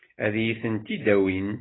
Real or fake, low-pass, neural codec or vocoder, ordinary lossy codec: real; 7.2 kHz; none; AAC, 16 kbps